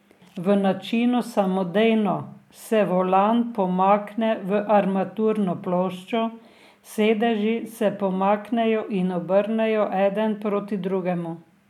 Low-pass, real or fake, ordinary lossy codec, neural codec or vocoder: 19.8 kHz; real; MP3, 96 kbps; none